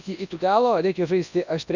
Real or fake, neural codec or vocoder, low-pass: fake; codec, 24 kHz, 0.9 kbps, WavTokenizer, large speech release; 7.2 kHz